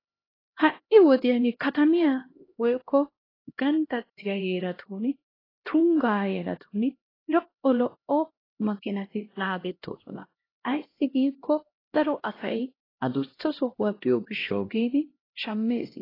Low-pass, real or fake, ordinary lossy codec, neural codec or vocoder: 5.4 kHz; fake; AAC, 24 kbps; codec, 16 kHz, 1 kbps, X-Codec, HuBERT features, trained on LibriSpeech